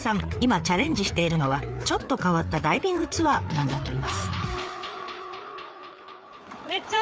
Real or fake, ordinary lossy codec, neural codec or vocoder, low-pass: fake; none; codec, 16 kHz, 4 kbps, FreqCodec, larger model; none